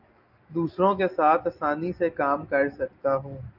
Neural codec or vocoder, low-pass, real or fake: none; 5.4 kHz; real